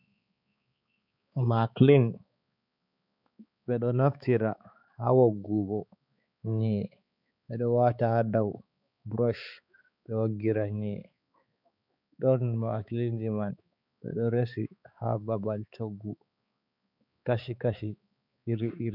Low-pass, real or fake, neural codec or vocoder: 5.4 kHz; fake; codec, 16 kHz, 4 kbps, X-Codec, HuBERT features, trained on balanced general audio